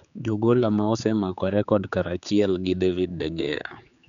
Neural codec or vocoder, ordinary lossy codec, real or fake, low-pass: codec, 16 kHz, 4 kbps, X-Codec, HuBERT features, trained on general audio; none; fake; 7.2 kHz